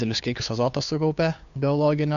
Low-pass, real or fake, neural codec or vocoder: 7.2 kHz; fake; codec, 16 kHz, 2 kbps, FunCodec, trained on Chinese and English, 25 frames a second